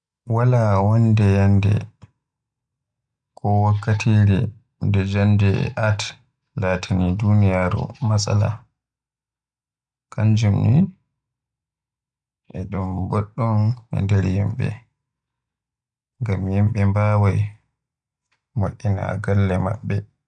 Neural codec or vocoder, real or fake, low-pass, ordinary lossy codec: none; real; 9.9 kHz; none